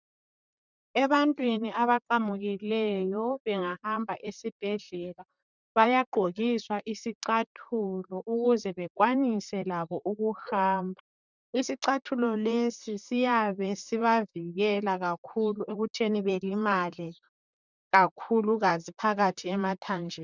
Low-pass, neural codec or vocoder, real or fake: 7.2 kHz; vocoder, 44.1 kHz, 128 mel bands, Pupu-Vocoder; fake